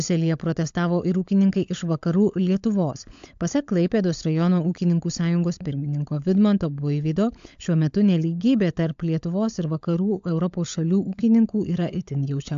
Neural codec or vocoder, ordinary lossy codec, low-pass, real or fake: codec, 16 kHz, 16 kbps, FunCodec, trained on LibriTTS, 50 frames a second; AAC, 64 kbps; 7.2 kHz; fake